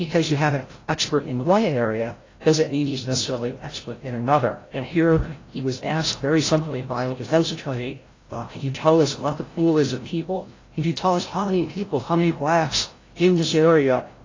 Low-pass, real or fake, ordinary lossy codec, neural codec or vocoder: 7.2 kHz; fake; AAC, 32 kbps; codec, 16 kHz, 0.5 kbps, FreqCodec, larger model